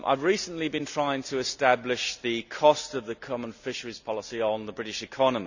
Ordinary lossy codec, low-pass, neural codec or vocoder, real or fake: none; 7.2 kHz; none; real